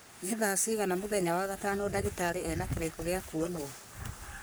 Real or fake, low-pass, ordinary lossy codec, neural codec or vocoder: fake; none; none; codec, 44.1 kHz, 3.4 kbps, Pupu-Codec